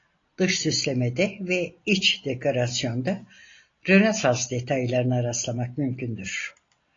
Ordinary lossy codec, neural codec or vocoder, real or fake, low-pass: AAC, 32 kbps; none; real; 7.2 kHz